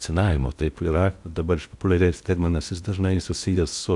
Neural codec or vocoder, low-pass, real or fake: codec, 16 kHz in and 24 kHz out, 0.6 kbps, FocalCodec, streaming, 4096 codes; 10.8 kHz; fake